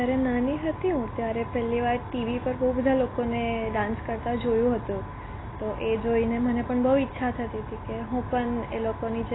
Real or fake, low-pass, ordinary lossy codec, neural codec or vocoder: real; 7.2 kHz; AAC, 16 kbps; none